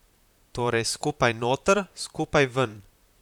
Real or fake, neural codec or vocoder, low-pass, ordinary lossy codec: fake; vocoder, 44.1 kHz, 128 mel bands, Pupu-Vocoder; 19.8 kHz; none